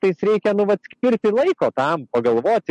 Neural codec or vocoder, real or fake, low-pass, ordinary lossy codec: none; real; 14.4 kHz; MP3, 48 kbps